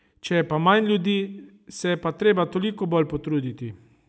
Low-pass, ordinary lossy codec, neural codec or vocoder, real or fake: none; none; none; real